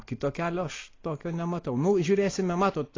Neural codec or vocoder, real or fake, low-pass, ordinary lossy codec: none; real; 7.2 kHz; AAC, 32 kbps